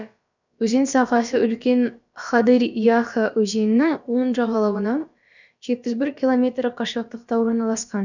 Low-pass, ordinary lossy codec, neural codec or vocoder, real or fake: 7.2 kHz; none; codec, 16 kHz, about 1 kbps, DyCAST, with the encoder's durations; fake